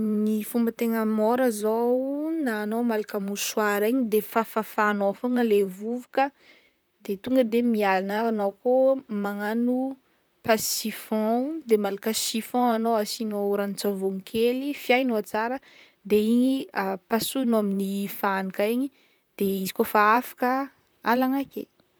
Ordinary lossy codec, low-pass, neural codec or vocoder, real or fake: none; none; vocoder, 44.1 kHz, 128 mel bands, Pupu-Vocoder; fake